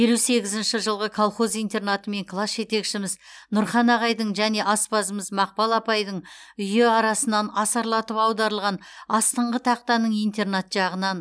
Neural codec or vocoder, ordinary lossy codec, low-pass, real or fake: none; none; none; real